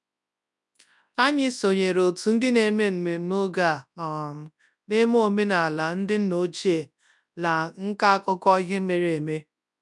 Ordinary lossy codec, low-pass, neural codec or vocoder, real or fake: none; 10.8 kHz; codec, 24 kHz, 0.9 kbps, WavTokenizer, large speech release; fake